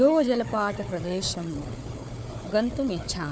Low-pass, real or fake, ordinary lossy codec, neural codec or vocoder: none; fake; none; codec, 16 kHz, 4 kbps, FunCodec, trained on Chinese and English, 50 frames a second